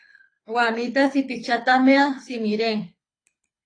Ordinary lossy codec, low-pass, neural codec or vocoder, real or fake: AAC, 32 kbps; 9.9 kHz; codec, 24 kHz, 6 kbps, HILCodec; fake